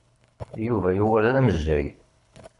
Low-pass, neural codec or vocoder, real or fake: 10.8 kHz; codec, 24 kHz, 3 kbps, HILCodec; fake